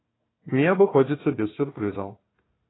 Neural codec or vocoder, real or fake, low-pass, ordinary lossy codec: codec, 16 kHz, 1 kbps, FunCodec, trained on Chinese and English, 50 frames a second; fake; 7.2 kHz; AAC, 16 kbps